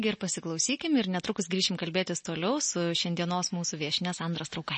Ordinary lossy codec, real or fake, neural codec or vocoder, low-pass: MP3, 32 kbps; real; none; 10.8 kHz